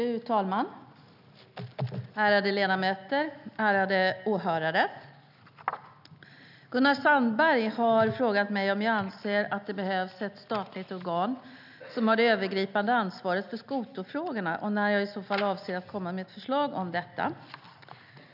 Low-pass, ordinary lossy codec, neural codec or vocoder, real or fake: 5.4 kHz; none; none; real